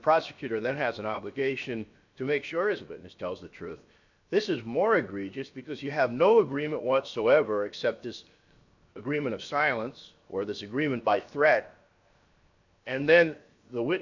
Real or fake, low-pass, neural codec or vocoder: fake; 7.2 kHz; codec, 16 kHz, 0.7 kbps, FocalCodec